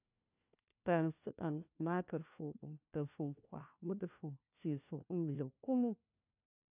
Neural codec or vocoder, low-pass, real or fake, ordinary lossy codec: codec, 16 kHz, 1 kbps, FunCodec, trained on LibriTTS, 50 frames a second; 3.6 kHz; fake; none